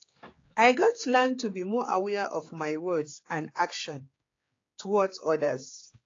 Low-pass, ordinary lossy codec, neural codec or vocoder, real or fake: 7.2 kHz; AAC, 32 kbps; codec, 16 kHz, 4 kbps, X-Codec, HuBERT features, trained on general audio; fake